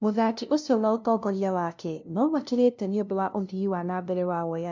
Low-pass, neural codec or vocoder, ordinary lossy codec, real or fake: 7.2 kHz; codec, 16 kHz, 0.5 kbps, FunCodec, trained on LibriTTS, 25 frames a second; MP3, 64 kbps; fake